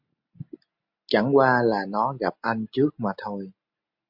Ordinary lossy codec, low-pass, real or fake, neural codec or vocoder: MP3, 48 kbps; 5.4 kHz; real; none